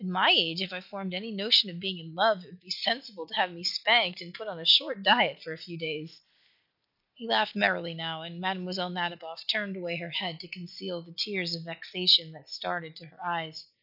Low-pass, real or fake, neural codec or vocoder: 5.4 kHz; real; none